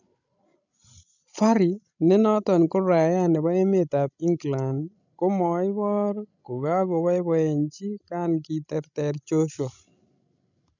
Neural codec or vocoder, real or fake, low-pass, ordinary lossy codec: codec, 16 kHz, 16 kbps, FreqCodec, larger model; fake; 7.2 kHz; none